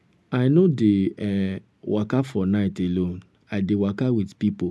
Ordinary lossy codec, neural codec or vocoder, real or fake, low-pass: none; none; real; none